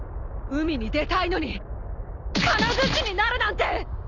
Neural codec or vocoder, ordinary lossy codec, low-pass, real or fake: none; none; 7.2 kHz; real